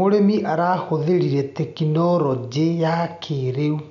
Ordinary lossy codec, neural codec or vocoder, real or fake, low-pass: none; none; real; 7.2 kHz